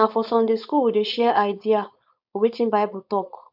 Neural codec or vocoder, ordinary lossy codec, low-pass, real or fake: codec, 16 kHz, 4.8 kbps, FACodec; none; 5.4 kHz; fake